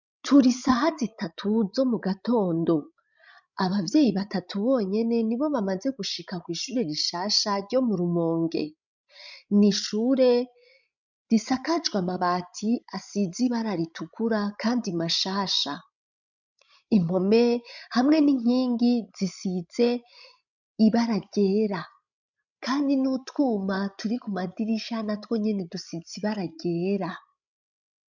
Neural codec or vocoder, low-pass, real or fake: codec, 16 kHz, 16 kbps, FreqCodec, larger model; 7.2 kHz; fake